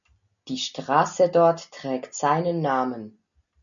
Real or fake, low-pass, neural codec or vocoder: real; 7.2 kHz; none